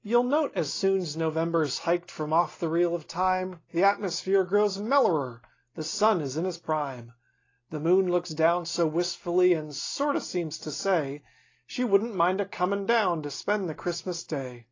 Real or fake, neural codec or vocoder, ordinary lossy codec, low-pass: real; none; AAC, 32 kbps; 7.2 kHz